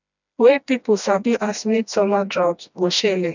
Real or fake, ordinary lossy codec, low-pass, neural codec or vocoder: fake; none; 7.2 kHz; codec, 16 kHz, 1 kbps, FreqCodec, smaller model